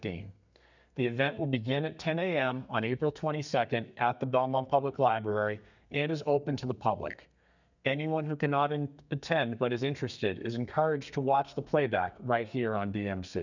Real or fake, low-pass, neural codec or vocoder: fake; 7.2 kHz; codec, 32 kHz, 1.9 kbps, SNAC